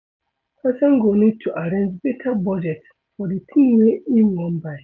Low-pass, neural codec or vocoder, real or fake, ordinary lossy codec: 7.2 kHz; none; real; none